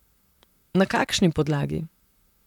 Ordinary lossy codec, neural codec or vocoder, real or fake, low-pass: none; vocoder, 44.1 kHz, 128 mel bands, Pupu-Vocoder; fake; 19.8 kHz